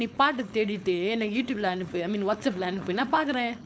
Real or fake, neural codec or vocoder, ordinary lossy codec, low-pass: fake; codec, 16 kHz, 4.8 kbps, FACodec; none; none